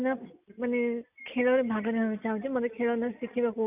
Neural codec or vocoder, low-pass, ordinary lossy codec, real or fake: none; 3.6 kHz; none; real